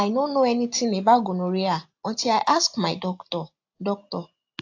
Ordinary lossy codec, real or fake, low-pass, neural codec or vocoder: AAC, 48 kbps; real; 7.2 kHz; none